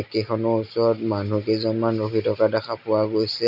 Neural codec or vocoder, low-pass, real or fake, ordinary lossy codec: none; 5.4 kHz; real; none